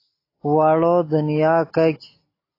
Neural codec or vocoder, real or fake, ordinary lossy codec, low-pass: none; real; AAC, 24 kbps; 5.4 kHz